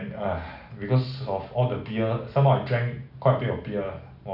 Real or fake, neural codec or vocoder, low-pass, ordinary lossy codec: real; none; 5.4 kHz; none